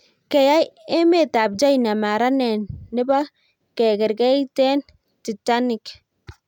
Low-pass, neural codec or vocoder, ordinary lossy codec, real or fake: 19.8 kHz; none; none; real